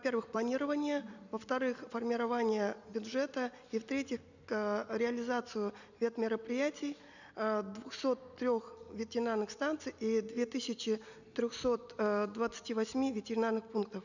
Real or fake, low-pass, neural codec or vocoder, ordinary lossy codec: real; 7.2 kHz; none; none